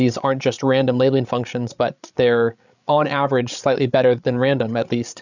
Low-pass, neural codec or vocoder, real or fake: 7.2 kHz; codec, 16 kHz, 16 kbps, FreqCodec, larger model; fake